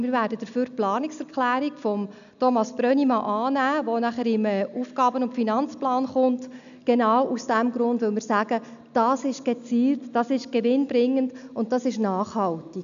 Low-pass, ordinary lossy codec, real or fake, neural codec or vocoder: 7.2 kHz; none; real; none